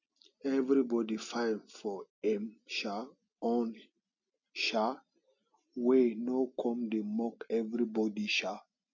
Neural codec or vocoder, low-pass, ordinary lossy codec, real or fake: none; 7.2 kHz; none; real